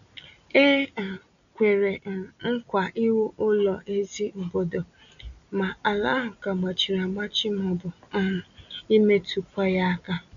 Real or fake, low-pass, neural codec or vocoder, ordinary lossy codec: real; 7.2 kHz; none; none